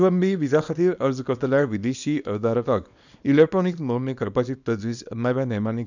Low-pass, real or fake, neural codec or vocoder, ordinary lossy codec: 7.2 kHz; fake; codec, 24 kHz, 0.9 kbps, WavTokenizer, small release; none